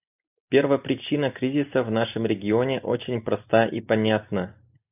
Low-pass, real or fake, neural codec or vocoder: 3.6 kHz; real; none